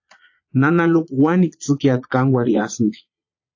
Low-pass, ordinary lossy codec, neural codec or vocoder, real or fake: 7.2 kHz; AAC, 48 kbps; vocoder, 22.05 kHz, 80 mel bands, Vocos; fake